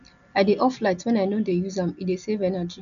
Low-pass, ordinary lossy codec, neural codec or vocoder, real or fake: 7.2 kHz; none; none; real